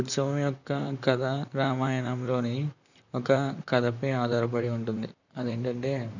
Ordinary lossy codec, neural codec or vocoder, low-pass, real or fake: none; vocoder, 44.1 kHz, 128 mel bands, Pupu-Vocoder; 7.2 kHz; fake